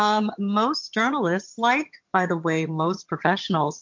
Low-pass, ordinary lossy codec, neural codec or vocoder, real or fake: 7.2 kHz; MP3, 48 kbps; vocoder, 22.05 kHz, 80 mel bands, HiFi-GAN; fake